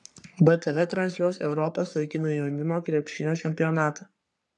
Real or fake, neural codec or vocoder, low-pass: fake; codec, 44.1 kHz, 3.4 kbps, Pupu-Codec; 10.8 kHz